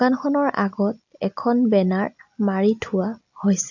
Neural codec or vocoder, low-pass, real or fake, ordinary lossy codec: none; 7.2 kHz; real; AAC, 48 kbps